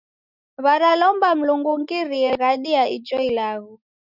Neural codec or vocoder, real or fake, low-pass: none; real; 5.4 kHz